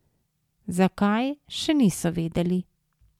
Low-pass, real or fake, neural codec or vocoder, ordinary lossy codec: 19.8 kHz; real; none; MP3, 96 kbps